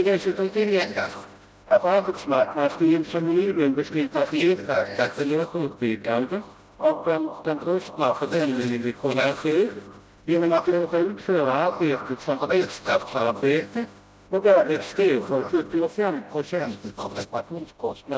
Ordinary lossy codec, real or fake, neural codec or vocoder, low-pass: none; fake; codec, 16 kHz, 0.5 kbps, FreqCodec, smaller model; none